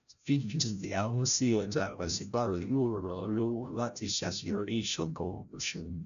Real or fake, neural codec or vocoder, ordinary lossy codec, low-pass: fake; codec, 16 kHz, 0.5 kbps, FreqCodec, larger model; none; 7.2 kHz